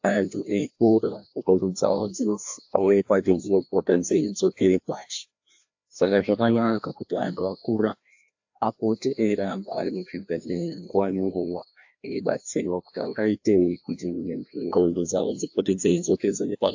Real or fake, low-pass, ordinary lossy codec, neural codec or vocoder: fake; 7.2 kHz; AAC, 48 kbps; codec, 16 kHz, 1 kbps, FreqCodec, larger model